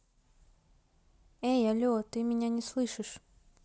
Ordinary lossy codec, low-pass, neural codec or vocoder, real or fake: none; none; none; real